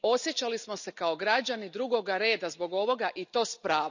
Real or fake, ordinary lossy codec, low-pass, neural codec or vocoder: real; none; 7.2 kHz; none